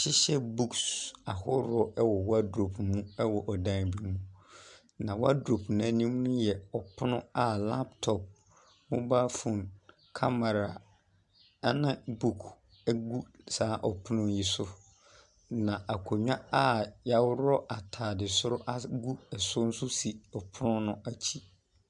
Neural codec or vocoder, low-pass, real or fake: none; 10.8 kHz; real